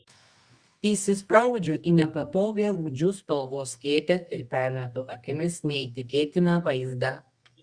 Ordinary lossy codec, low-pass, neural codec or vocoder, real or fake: Opus, 64 kbps; 9.9 kHz; codec, 24 kHz, 0.9 kbps, WavTokenizer, medium music audio release; fake